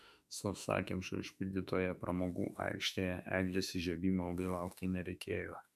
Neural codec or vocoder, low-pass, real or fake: autoencoder, 48 kHz, 32 numbers a frame, DAC-VAE, trained on Japanese speech; 14.4 kHz; fake